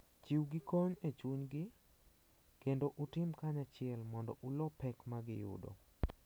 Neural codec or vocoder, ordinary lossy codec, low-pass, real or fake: none; none; none; real